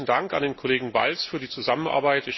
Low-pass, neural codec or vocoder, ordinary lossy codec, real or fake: 7.2 kHz; none; MP3, 24 kbps; real